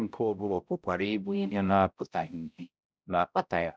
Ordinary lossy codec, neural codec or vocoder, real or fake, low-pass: none; codec, 16 kHz, 0.5 kbps, X-Codec, HuBERT features, trained on balanced general audio; fake; none